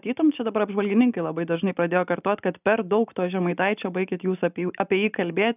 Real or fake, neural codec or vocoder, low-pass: real; none; 3.6 kHz